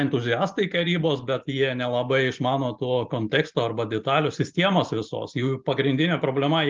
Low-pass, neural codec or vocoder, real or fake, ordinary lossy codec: 7.2 kHz; none; real; Opus, 24 kbps